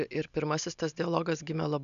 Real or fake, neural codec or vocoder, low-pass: real; none; 7.2 kHz